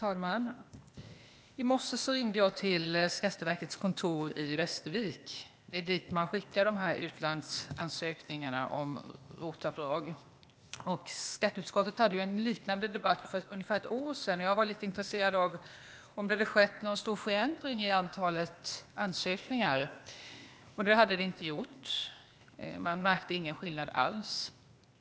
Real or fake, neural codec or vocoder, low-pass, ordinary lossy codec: fake; codec, 16 kHz, 0.8 kbps, ZipCodec; none; none